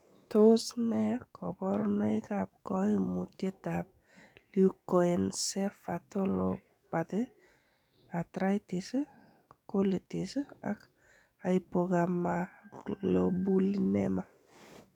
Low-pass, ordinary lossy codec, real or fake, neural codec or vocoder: 19.8 kHz; none; fake; codec, 44.1 kHz, 7.8 kbps, DAC